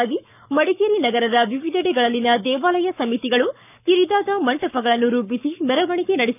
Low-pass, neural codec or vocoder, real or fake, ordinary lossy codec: 3.6 kHz; codec, 44.1 kHz, 7.8 kbps, Pupu-Codec; fake; none